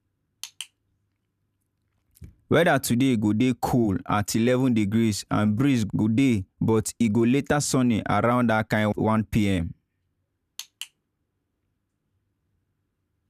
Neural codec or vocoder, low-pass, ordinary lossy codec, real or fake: vocoder, 44.1 kHz, 128 mel bands every 512 samples, BigVGAN v2; 14.4 kHz; none; fake